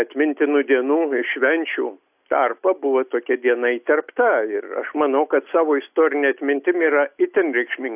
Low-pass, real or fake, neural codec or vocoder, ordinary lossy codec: 3.6 kHz; real; none; AAC, 32 kbps